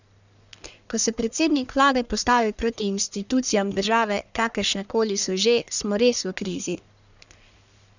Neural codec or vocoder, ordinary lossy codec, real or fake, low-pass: codec, 44.1 kHz, 1.7 kbps, Pupu-Codec; none; fake; 7.2 kHz